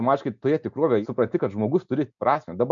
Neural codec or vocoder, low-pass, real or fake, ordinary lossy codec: none; 7.2 kHz; real; MP3, 64 kbps